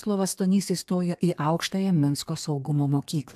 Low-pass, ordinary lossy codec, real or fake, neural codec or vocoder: 14.4 kHz; AAC, 96 kbps; fake; codec, 32 kHz, 1.9 kbps, SNAC